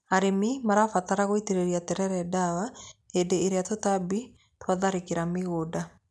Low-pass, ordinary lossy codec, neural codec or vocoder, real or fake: none; none; none; real